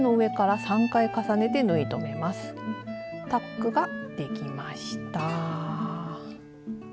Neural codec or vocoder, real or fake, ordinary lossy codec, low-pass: none; real; none; none